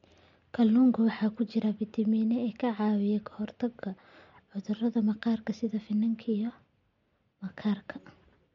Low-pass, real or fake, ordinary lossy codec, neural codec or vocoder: 7.2 kHz; real; MP3, 48 kbps; none